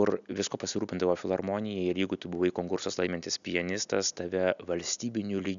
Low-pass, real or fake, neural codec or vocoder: 7.2 kHz; real; none